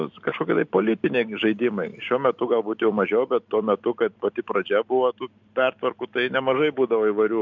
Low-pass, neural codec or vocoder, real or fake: 7.2 kHz; none; real